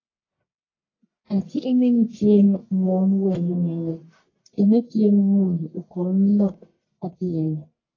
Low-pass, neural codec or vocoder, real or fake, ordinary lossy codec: 7.2 kHz; codec, 44.1 kHz, 1.7 kbps, Pupu-Codec; fake; AAC, 32 kbps